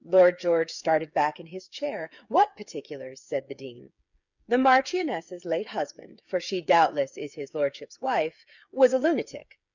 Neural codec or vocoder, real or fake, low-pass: codec, 16 kHz, 8 kbps, FreqCodec, smaller model; fake; 7.2 kHz